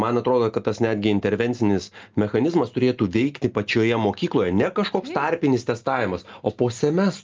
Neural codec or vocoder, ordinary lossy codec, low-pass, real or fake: none; Opus, 24 kbps; 7.2 kHz; real